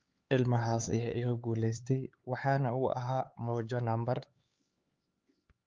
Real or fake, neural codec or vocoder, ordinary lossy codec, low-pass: fake; codec, 16 kHz, 4 kbps, X-Codec, HuBERT features, trained on LibriSpeech; Opus, 24 kbps; 7.2 kHz